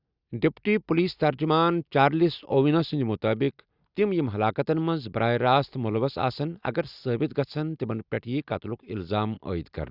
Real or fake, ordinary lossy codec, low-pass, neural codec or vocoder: real; Opus, 64 kbps; 5.4 kHz; none